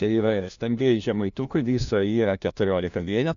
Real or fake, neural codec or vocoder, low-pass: fake; codec, 16 kHz, 1 kbps, FunCodec, trained on LibriTTS, 50 frames a second; 7.2 kHz